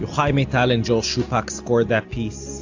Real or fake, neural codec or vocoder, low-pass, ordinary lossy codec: real; none; 7.2 kHz; AAC, 48 kbps